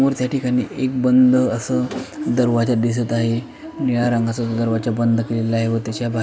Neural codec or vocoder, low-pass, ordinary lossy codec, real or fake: none; none; none; real